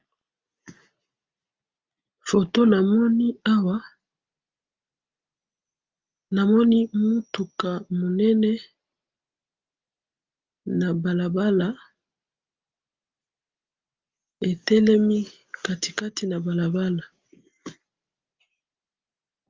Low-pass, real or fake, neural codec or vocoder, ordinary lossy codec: 7.2 kHz; real; none; Opus, 32 kbps